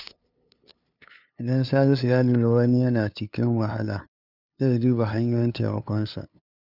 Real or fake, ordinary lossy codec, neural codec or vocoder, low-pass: fake; none; codec, 16 kHz, 2 kbps, FunCodec, trained on LibriTTS, 25 frames a second; 5.4 kHz